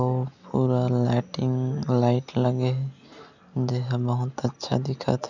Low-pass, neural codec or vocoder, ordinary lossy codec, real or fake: 7.2 kHz; none; none; real